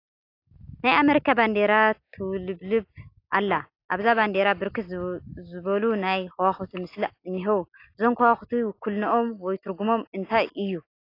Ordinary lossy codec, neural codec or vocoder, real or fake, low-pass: AAC, 32 kbps; none; real; 5.4 kHz